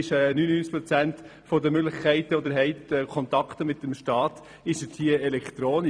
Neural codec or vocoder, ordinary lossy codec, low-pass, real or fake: vocoder, 44.1 kHz, 128 mel bands every 256 samples, BigVGAN v2; MP3, 96 kbps; 9.9 kHz; fake